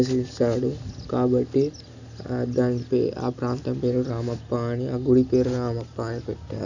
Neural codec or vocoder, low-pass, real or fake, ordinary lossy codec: none; 7.2 kHz; real; none